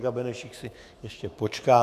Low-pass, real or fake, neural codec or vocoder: 14.4 kHz; fake; autoencoder, 48 kHz, 128 numbers a frame, DAC-VAE, trained on Japanese speech